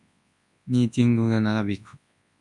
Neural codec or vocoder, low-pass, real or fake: codec, 24 kHz, 0.9 kbps, WavTokenizer, large speech release; 10.8 kHz; fake